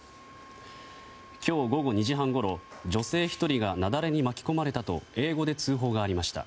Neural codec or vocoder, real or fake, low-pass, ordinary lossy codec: none; real; none; none